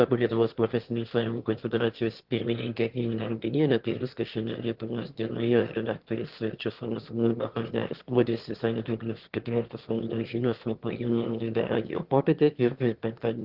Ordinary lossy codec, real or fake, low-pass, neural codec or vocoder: Opus, 16 kbps; fake; 5.4 kHz; autoencoder, 22.05 kHz, a latent of 192 numbers a frame, VITS, trained on one speaker